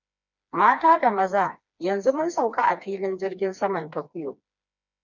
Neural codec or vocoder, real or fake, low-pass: codec, 16 kHz, 2 kbps, FreqCodec, smaller model; fake; 7.2 kHz